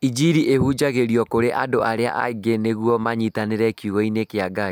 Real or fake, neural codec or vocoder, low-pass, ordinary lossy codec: real; none; none; none